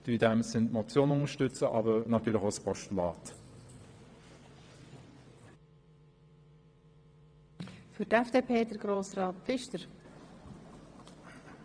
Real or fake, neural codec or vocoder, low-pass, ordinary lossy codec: fake; vocoder, 22.05 kHz, 80 mel bands, WaveNeXt; 9.9 kHz; none